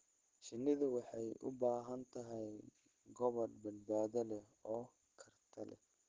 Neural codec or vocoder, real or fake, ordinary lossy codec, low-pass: none; real; Opus, 16 kbps; 7.2 kHz